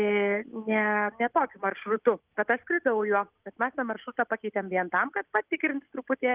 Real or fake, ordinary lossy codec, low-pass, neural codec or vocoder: real; Opus, 24 kbps; 3.6 kHz; none